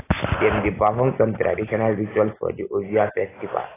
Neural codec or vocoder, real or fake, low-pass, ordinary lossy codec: none; real; 3.6 kHz; AAC, 16 kbps